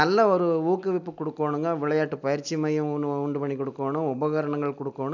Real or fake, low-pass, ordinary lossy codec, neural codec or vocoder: real; 7.2 kHz; none; none